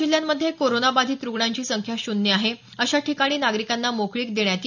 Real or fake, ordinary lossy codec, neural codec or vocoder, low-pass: real; none; none; 7.2 kHz